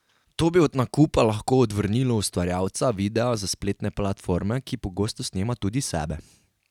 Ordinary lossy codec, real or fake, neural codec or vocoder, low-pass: none; real; none; 19.8 kHz